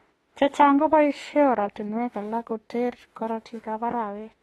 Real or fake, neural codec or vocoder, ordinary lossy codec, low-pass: fake; autoencoder, 48 kHz, 32 numbers a frame, DAC-VAE, trained on Japanese speech; AAC, 32 kbps; 19.8 kHz